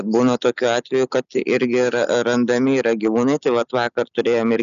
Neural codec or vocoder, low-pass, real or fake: none; 7.2 kHz; real